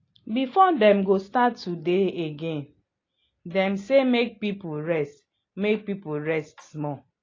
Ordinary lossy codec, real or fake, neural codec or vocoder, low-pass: AAC, 32 kbps; real; none; 7.2 kHz